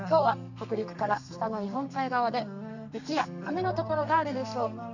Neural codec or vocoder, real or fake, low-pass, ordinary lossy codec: codec, 32 kHz, 1.9 kbps, SNAC; fake; 7.2 kHz; none